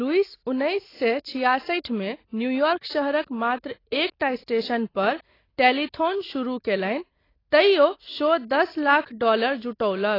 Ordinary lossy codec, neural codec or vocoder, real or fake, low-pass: AAC, 24 kbps; none; real; 5.4 kHz